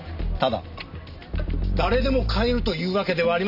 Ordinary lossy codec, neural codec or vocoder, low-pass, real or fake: none; none; 5.4 kHz; real